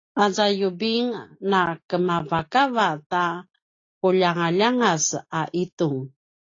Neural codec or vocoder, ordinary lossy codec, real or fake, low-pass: none; AAC, 32 kbps; real; 7.2 kHz